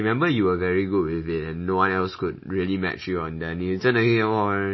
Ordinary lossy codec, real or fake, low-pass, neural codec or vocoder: MP3, 24 kbps; real; 7.2 kHz; none